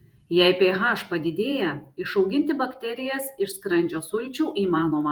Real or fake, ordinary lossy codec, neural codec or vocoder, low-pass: fake; Opus, 32 kbps; vocoder, 48 kHz, 128 mel bands, Vocos; 19.8 kHz